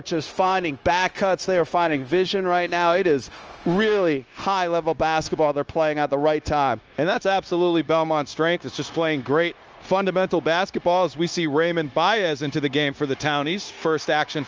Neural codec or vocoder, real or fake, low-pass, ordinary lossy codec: codec, 16 kHz, 0.9 kbps, LongCat-Audio-Codec; fake; 7.2 kHz; Opus, 24 kbps